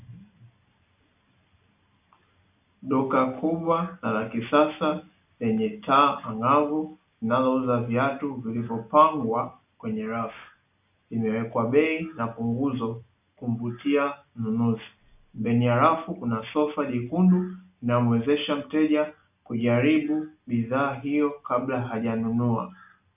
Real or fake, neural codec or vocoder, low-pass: real; none; 3.6 kHz